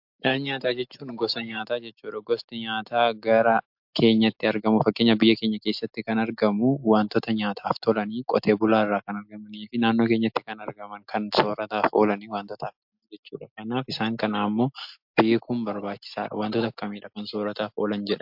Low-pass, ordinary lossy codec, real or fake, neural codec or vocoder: 5.4 kHz; MP3, 48 kbps; real; none